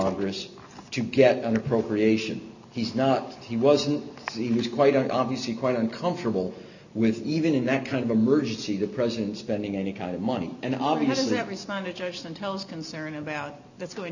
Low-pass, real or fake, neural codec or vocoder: 7.2 kHz; real; none